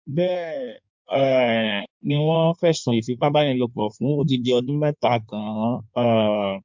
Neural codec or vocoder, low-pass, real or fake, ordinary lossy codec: codec, 16 kHz in and 24 kHz out, 1.1 kbps, FireRedTTS-2 codec; 7.2 kHz; fake; MP3, 64 kbps